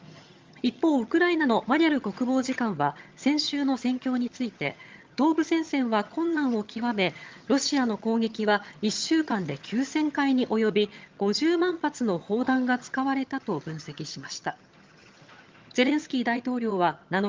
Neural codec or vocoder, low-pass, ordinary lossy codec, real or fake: vocoder, 22.05 kHz, 80 mel bands, HiFi-GAN; 7.2 kHz; Opus, 32 kbps; fake